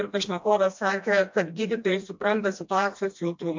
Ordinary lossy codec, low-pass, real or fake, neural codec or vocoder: MP3, 48 kbps; 7.2 kHz; fake; codec, 16 kHz, 1 kbps, FreqCodec, smaller model